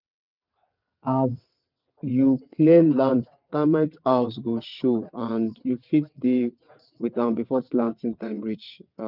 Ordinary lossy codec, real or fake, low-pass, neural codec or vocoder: none; fake; 5.4 kHz; vocoder, 22.05 kHz, 80 mel bands, WaveNeXt